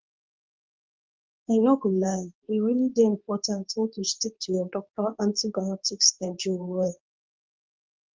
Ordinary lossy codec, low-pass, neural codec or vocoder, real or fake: Opus, 32 kbps; 7.2 kHz; codec, 24 kHz, 0.9 kbps, WavTokenizer, medium speech release version 2; fake